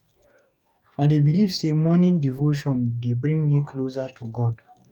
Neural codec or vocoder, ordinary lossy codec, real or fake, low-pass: codec, 44.1 kHz, 2.6 kbps, DAC; none; fake; 19.8 kHz